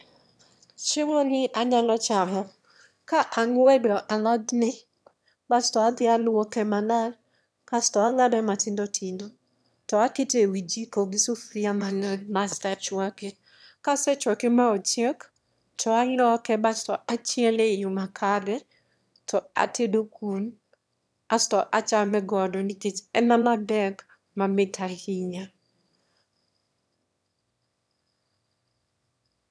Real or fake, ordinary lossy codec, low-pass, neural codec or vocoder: fake; none; none; autoencoder, 22.05 kHz, a latent of 192 numbers a frame, VITS, trained on one speaker